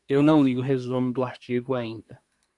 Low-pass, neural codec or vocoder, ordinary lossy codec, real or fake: 10.8 kHz; codec, 24 kHz, 1 kbps, SNAC; MP3, 96 kbps; fake